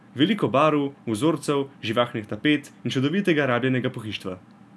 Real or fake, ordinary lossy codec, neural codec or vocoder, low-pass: real; none; none; none